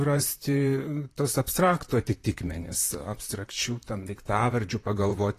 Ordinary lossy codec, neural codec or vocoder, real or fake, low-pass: AAC, 48 kbps; vocoder, 44.1 kHz, 128 mel bands, Pupu-Vocoder; fake; 14.4 kHz